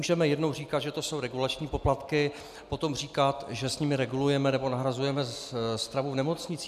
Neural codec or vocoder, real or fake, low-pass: none; real; 14.4 kHz